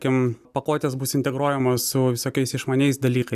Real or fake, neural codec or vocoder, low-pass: real; none; 14.4 kHz